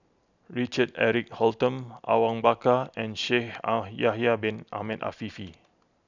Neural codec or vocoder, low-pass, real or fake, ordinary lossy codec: none; 7.2 kHz; real; none